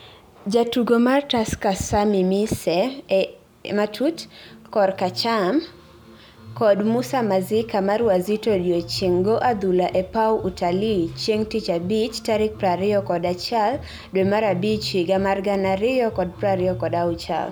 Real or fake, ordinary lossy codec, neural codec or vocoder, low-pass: real; none; none; none